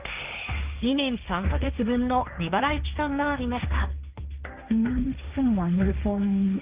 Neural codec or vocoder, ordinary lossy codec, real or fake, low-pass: codec, 16 kHz, 1.1 kbps, Voila-Tokenizer; Opus, 24 kbps; fake; 3.6 kHz